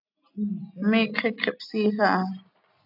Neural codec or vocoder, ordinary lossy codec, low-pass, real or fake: none; AAC, 48 kbps; 5.4 kHz; real